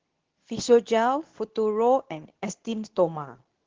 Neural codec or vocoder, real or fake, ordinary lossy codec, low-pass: codec, 24 kHz, 0.9 kbps, WavTokenizer, medium speech release version 2; fake; Opus, 16 kbps; 7.2 kHz